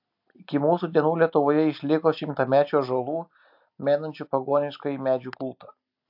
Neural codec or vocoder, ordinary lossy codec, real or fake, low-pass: none; AAC, 48 kbps; real; 5.4 kHz